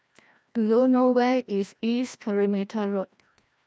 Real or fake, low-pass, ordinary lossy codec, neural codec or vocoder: fake; none; none; codec, 16 kHz, 1 kbps, FreqCodec, larger model